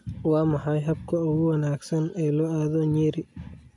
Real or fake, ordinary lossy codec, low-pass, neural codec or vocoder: real; none; 10.8 kHz; none